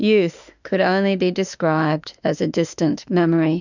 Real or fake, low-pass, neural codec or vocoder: fake; 7.2 kHz; autoencoder, 48 kHz, 32 numbers a frame, DAC-VAE, trained on Japanese speech